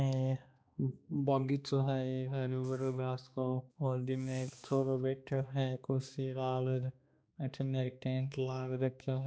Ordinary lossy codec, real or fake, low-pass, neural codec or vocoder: none; fake; none; codec, 16 kHz, 2 kbps, X-Codec, HuBERT features, trained on balanced general audio